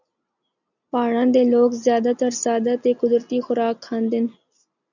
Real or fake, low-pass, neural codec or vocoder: real; 7.2 kHz; none